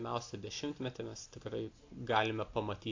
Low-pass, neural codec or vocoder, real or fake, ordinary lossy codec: 7.2 kHz; none; real; AAC, 48 kbps